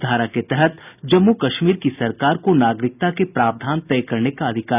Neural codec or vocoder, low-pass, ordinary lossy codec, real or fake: none; 3.6 kHz; none; real